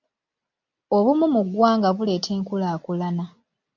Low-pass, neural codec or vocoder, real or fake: 7.2 kHz; none; real